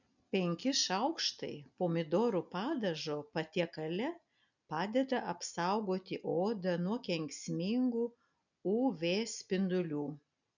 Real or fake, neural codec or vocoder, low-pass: real; none; 7.2 kHz